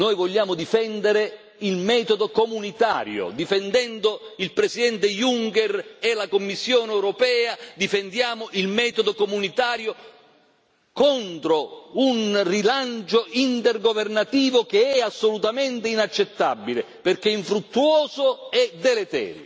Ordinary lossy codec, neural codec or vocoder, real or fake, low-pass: none; none; real; none